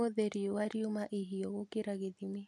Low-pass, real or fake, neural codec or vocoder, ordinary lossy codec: none; real; none; none